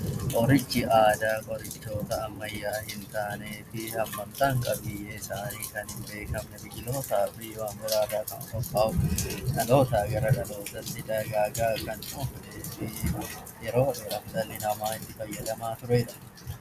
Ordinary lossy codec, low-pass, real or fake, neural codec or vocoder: MP3, 96 kbps; 14.4 kHz; real; none